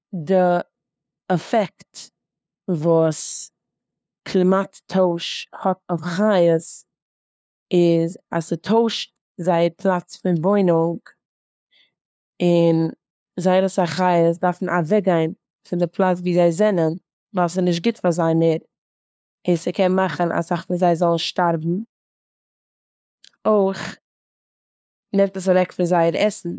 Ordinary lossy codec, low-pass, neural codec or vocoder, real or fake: none; none; codec, 16 kHz, 2 kbps, FunCodec, trained on LibriTTS, 25 frames a second; fake